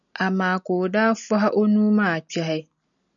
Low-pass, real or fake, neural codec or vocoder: 7.2 kHz; real; none